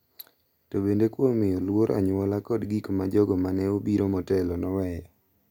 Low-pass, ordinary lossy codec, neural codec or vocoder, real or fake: none; none; none; real